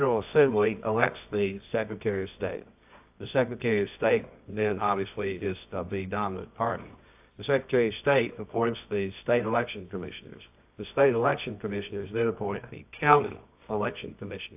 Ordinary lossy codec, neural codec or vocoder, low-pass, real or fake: AAC, 32 kbps; codec, 24 kHz, 0.9 kbps, WavTokenizer, medium music audio release; 3.6 kHz; fake